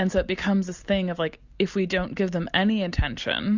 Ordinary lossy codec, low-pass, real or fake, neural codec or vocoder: Opus, 64 kbps; 7.2 kHz; real; none